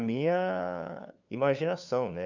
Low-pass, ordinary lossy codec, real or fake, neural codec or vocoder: 7.2 kHz; none; fake; codec, 16 kHz, 2 kbps, FunCodec, trained on LibriTTS, 25 frames a second